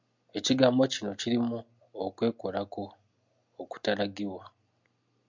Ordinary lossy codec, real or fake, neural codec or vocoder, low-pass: MP3, 64 kbps; real; none; 7.2 kHz